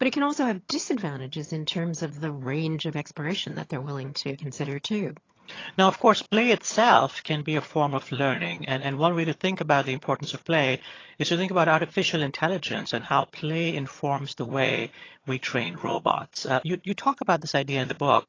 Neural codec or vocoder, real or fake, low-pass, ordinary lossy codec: vocoder, 22.05 kHz, 80 mel bands, HiFi-GAN; fake; 7.2 kHz; AAC, 32 kbps